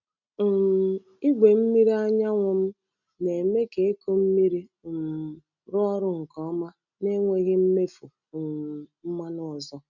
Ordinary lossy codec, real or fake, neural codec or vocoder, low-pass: none; real; none; 7.2 kHz